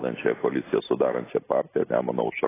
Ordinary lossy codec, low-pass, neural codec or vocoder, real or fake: AAC, 16 kbps; 3.6 kHz; none; real